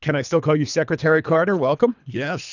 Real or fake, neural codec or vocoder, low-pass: fake; codec, 24 kHz, 3 kbps, HILCodec; 7.2 kHz